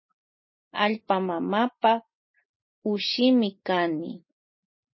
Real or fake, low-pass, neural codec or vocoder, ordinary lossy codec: real; 7.2 kHz; none; MP3, 24 kbps